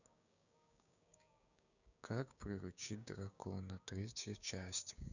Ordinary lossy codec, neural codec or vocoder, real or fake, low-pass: none; codec, 16 kHz, 6 kbps, DAC; fake; 7.2 kHz